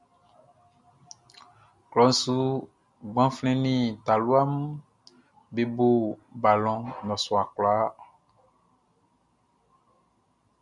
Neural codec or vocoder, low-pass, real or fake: none; 10.8 kHz; real